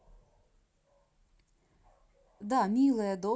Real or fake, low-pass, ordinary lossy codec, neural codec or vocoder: real; none; none; none